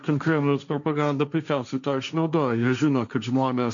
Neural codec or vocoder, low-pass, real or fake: codec, 16 kHz, 1.1 kbps, Voila-Tokenizer; 7.2 kHz; fake